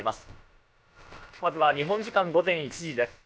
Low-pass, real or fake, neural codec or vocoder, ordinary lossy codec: none; fake; codec, 16 kHz, about 1 kbps, DyCAST, with the encoder's durations; none